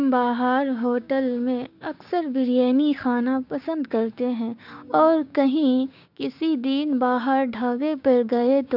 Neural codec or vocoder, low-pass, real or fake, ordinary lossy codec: codec, 16 kHz, 6 kbps, DAC; 5.4 kHz; fake; none